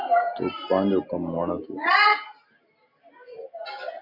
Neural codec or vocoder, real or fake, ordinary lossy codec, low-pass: none; real; Opus, 64 kbps; 5.4 kHz